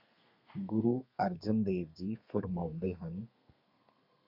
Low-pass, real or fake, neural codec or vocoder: 5.4 kHz; fake; codec, 32 kHz, 1.9 kbps, SNAC